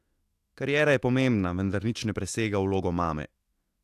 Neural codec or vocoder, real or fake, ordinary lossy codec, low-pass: autoencoder, 48 kHz, 128 numbers a frame, DAC-VAE, trained on Japanese speech; fake; AAC, 64 kbps; 14.4 kHz